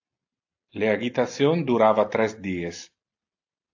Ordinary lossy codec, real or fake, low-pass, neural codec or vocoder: AAC, 48 kbps; real; 7.2 kHz; none